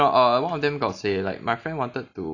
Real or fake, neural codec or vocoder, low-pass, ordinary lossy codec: real; none; 7.2 kHz; AAC, 48 kbps